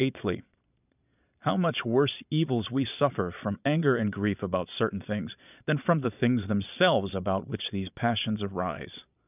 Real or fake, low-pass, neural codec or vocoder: fake; 3.6 kHz; vocoder, 22.05 kHz, 80 mel bands, Vocos